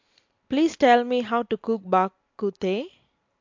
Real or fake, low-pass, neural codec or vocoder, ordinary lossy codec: real; 7.2 kHz; none; MP3, 48 kbps